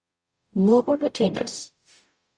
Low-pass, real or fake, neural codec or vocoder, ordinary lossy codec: 9.9 kHz; fake; codec, 44.1 kHz, 0.9 kbps, DAC; Opus, 64 kbps